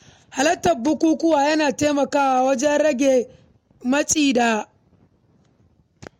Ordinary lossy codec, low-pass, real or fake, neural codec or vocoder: MP3, 64 kbps; 19.8 kHz; real; none